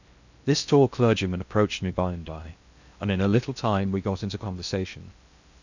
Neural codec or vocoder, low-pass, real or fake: codec, 16 kHz in and 24 kHz out, 0.6 kbps, FocalCodec, streaming, 4096 codes; 7.2 kHz; fake